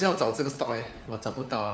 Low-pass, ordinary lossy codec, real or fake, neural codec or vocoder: none; none; fake; codec, 16 kHz, 4 kbps, FunCodec, trained on LibriTTS, 50 frames a second